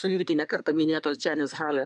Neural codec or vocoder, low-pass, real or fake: codec, 24 kHz, 1 kbps, SNAC; 10.8 kHz; fake